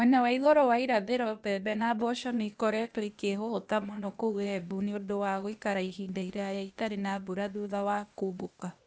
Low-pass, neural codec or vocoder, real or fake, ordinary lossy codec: none; codec, 16 kHz, 0.8 kbps, ZipCodec; fake; none